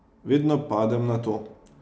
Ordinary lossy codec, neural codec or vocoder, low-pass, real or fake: none; none; none; real